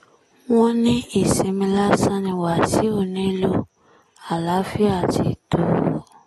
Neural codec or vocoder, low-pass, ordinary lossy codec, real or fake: none; 19.8 kHz; AAC, 32 kbps; real